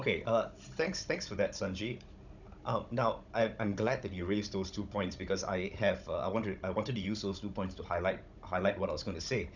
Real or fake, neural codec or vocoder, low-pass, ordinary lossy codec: fake; codec, 16 kHz, 16 kbps, FunCodec, trained on Chinese and English, 50 frames a second; 7.2 kHz; none